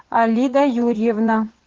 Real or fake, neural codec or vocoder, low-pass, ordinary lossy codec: fake; vocoder, 44.1 kHz, 80 mel bands, Vocos; 7.2 kHz; Opus, 16 kbps